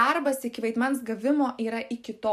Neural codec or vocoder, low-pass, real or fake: none; 14.4 kHz; real